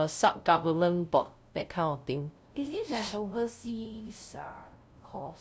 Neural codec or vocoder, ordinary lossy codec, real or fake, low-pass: codec, 16 kHz, 0.5 kbps, FunCodec, trained on LibriTTS, 25 frames a second; none; fake; none